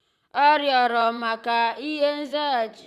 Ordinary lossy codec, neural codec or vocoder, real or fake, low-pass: MP3, 96 kbps; vocoder, 44.1 kHz, 128 mel bands, Pupu-Vocoder; fake; 14.4 kHz